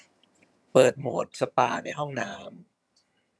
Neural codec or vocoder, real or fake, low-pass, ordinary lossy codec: vocoder, 22.05 kHz, 80 mel bands, HiFi-GAN; fake; none; none